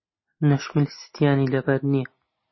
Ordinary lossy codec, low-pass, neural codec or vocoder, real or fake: MP3, 24 kbps; 7.2 kHz; none; real